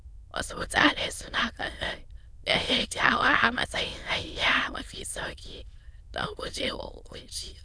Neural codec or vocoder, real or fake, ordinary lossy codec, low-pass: autoencoder, 22.05 kHz, a latent of 192 numbers a frame, VITS, trained on many speakers; fake; none; none